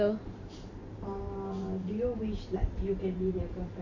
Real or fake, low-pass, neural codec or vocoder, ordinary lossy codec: fake; 7.2 kHz; vocoder, 44.1 kHz, 128 mel bands every 512 samples, BigVGAN v2; none